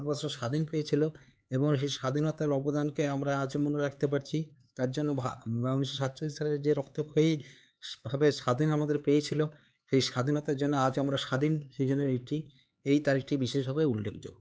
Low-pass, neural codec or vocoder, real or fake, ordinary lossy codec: none; codec, 16 kHz, 4 kbps, X-Codec, HuBERT features, trained on LibriSpeech; fake; none